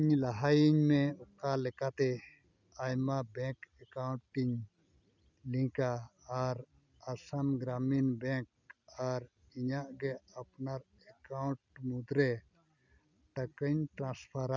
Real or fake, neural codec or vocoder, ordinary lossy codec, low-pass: real; none; none; 7.2 kHz